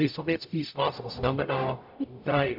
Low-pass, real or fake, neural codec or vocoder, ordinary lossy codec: 5.4 kHz; fake; codec, 44.1 kHz, 0.9 kbps, DAC; AAC, 48 kbps